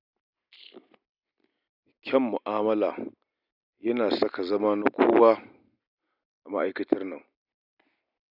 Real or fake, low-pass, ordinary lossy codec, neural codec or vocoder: real; 5.4 kHz; none; none